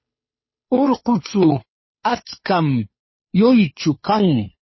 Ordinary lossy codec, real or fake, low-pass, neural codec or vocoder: MP3, 24 kbps; fake; 7.2 kHz; codec, 16 kHz, 2 kbps, FunCodec, trained on Chinese and English, 25 frames a second